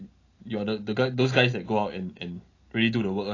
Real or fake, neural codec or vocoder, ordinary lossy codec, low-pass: real; none; AAC, 32 kbps; 7.2 kHz